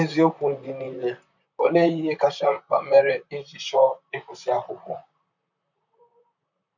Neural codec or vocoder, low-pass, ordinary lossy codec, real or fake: vocoder, 44.1 kHz, 128 mel bands, Pupu-Vocoder; 7.2 kHz; none; fake